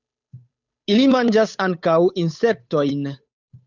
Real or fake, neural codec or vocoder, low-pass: fake; codec, 16 kHz, 8 kbps, FunCodec, trained on Chinese and English, 25 frames a second; 7.2 kHz